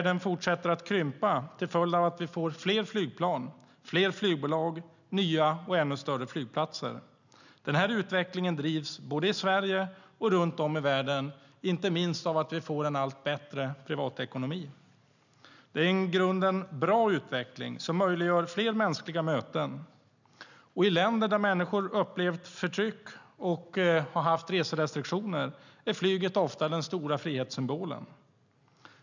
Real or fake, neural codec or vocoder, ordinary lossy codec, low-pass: real; none; none; 7.2 kHz